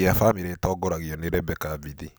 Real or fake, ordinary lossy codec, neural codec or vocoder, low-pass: real; none; none; none